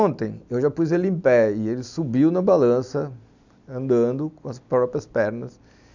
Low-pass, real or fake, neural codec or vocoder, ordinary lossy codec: 7.2 kHz; real; none; none